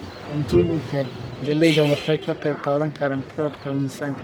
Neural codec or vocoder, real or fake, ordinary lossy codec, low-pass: codec, 44.1 kHz, 1.7 kbps, Pupu-Codec; fake; none; none